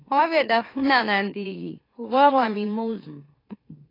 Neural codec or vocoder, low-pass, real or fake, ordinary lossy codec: autoencoder, 44.1 kHz, a latent of 192 numbers a frame, MeloTTS; 5.4 kHz; fake; AAC, 24 kbps